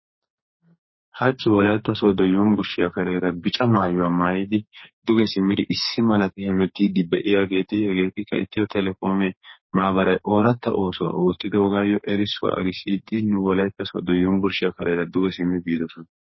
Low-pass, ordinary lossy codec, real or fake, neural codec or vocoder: 7.2 kHz; MP3, 24 kbps; fake; codec, 44.1 kHz, 2.6 kbps, SNAC